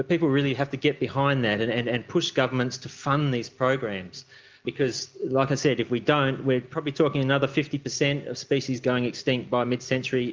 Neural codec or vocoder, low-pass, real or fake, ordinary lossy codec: none; 7.2 kHz; real; Opus, 24 kbps